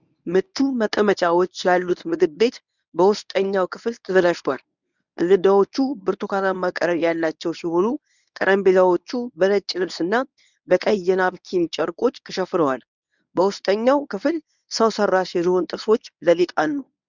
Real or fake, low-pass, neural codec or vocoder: fake; 7.2 kHz; codec, 24 kHz, 0.9 kbps, WavTokenizer, medium speech release version 1